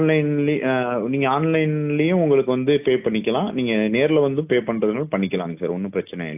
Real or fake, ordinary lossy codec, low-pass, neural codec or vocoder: real; AAC, 32 kbps; 3.6 kHz; none